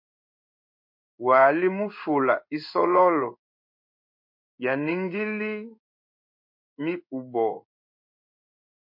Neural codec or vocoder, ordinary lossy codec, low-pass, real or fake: codec, 16 kHz in and 24 kHz out, 1 kbps, XY-Tokenizer; MP3, 32 kbps; 5.4 kHz; fake